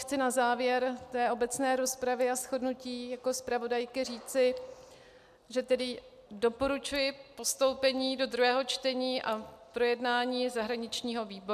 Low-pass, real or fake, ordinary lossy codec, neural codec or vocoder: 14.4 kHz; real; AAC, 96 kbps; none